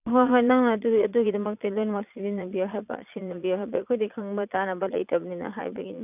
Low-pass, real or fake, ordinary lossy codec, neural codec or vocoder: 3.6 kHz; fake; none; vocoder, 22.05 kHz, 80 mel bands, Vocos